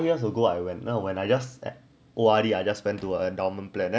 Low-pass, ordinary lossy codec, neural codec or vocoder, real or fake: none; none; none; real